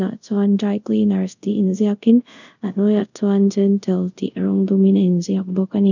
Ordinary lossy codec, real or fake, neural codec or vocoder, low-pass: none; fake; codec, 24 kHz, 0.5 kbps, DualCodec; 7.2 kHz